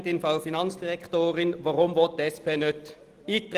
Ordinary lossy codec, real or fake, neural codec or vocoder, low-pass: Opus, 24 kbps; real; none; 14.4 kHz